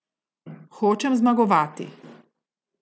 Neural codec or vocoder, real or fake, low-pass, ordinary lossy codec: none; real; none; none